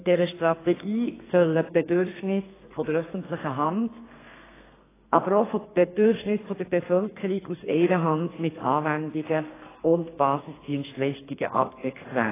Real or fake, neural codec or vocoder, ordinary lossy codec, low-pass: fake; codec, 32 kHz, 1.9 kbps, SNAC; AAC, 16 kbps; 3.6 kHz